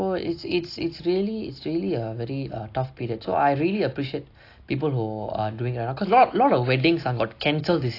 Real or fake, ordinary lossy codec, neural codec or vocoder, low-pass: real; AAC, 32 kbps; none; 5.4 kHz